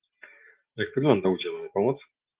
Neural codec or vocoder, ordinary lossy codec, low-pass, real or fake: none; Opus, 16 kbps; 3.6 kHz; real